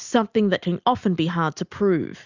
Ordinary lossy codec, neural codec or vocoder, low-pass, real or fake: Opus, 64 kbps; none; 7.2 kHz; real